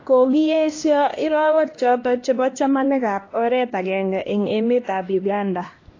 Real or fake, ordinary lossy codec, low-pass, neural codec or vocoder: fake; AAC, 32 kbps; 7.2 kHz; codec, 16 kHz, 1 kbps, X-Codec, HuBERT features, trained on LibriSpeech